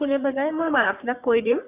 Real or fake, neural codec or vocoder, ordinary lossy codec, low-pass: fake; codec, 44.1 kHz, 3.4 kbps, Pupu-Codec; none; 3.6 kHz